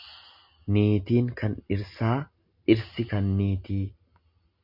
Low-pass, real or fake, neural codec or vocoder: 5.4 kHz; real; none